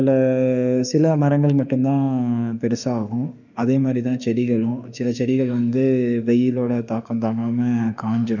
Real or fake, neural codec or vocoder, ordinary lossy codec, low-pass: fake; autoencoder, 48 kHz, 32 numbers a frame, DAC-VAE, trained on Japanese speech; none; 7.2 kHz